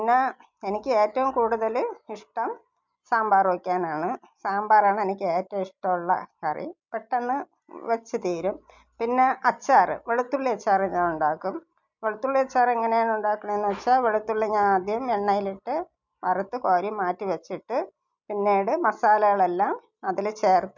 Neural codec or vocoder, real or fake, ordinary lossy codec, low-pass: none; real; MP3, 64 kbps; 7.2 kHz